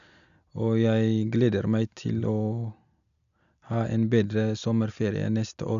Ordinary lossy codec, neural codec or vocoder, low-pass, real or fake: none; none; 7.2 kHz; real